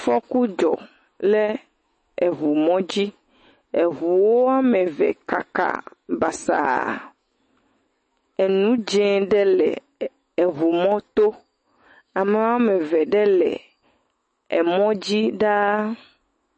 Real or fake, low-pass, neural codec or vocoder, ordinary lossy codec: fake; 10.8 kHz; autoencoder, 48 kHz, 128 numbers a frame, DAC-VAE, trained on Japanese speech; MP3, 32 kbps